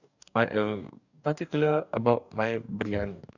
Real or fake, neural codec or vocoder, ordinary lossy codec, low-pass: fake; codec, 44.1 kHz, 2.6 kbps, DAC; none; 7.2 kHz